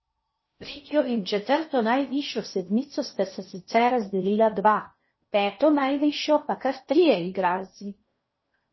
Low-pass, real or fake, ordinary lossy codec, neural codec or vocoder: 7.2 kHz; fake; MP3, 24 kbps; codec, 16 kHz in and 24 kHz out, 0.6 kbps, FocalCodec, streaming, 4096 codes